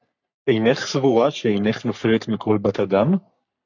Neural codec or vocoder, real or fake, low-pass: codec, 44.1 kHz, 3.4 kbps, Pupu-Codec; fake; 7.2 kHz